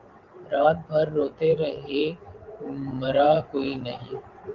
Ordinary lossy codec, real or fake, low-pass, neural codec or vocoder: Opus, 24 kbps; fake; 7.2 kHz; vocoder, 22.05 kHz, 80 mel bands, WaveNeXt